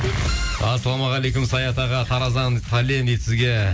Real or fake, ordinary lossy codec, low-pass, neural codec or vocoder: real; none; none; none